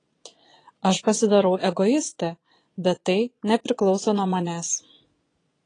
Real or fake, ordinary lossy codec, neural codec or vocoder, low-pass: fake; AAC, 32 kbps; vocoder, 22.05 kHz, 80 mel bands, Vocos; 9.9 kHz